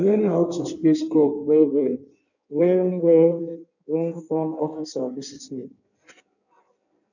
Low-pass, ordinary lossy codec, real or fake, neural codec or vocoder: 7.2 kHz; none; fake; codec, 16 kHz in and 24 kHz out, 1.1 kbps, FireRedTTS-2 codec